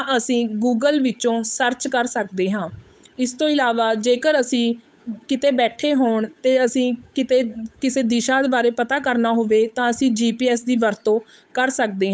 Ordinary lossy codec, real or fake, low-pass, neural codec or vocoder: none; fake; none; codec, 16 kHz, 8 kbps, FunCodec, trained on Chinese and English, 25 frames a second